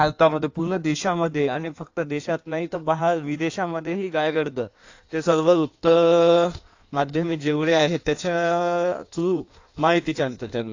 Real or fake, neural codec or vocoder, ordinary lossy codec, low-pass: fake; codec, 16 kHz in and 24 kHz out, 1.1 kbps, FireRedTTS-2 codec; AAC, 48 kbps; 7.2 kHz